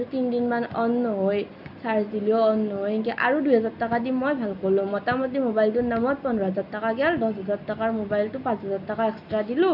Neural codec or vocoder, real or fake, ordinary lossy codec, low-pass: none; real; none; 5.4 kHz